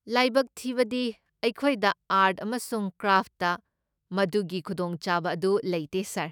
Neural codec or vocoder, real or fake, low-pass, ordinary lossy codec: autoencoder, 48 kHz, 128 numbers a frame, DAC-VAE, trained on Japanese speech; fake; none; none